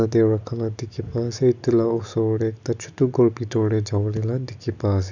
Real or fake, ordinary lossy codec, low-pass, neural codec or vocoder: real; none; 7.2 kHz; none